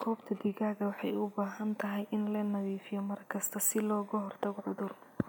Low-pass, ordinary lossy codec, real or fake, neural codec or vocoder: none; none; real; none